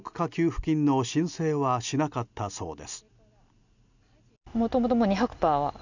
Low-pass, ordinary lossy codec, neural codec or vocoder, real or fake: 7.2 kHz; none; none; real